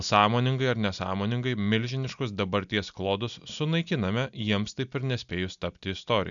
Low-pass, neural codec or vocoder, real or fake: 7.2 kHz; none; real